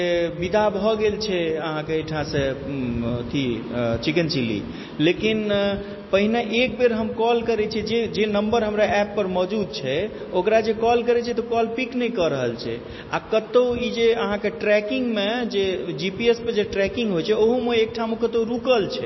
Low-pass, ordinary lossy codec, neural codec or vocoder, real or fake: 7.2 kHz; MP3, 24 kbps; none; real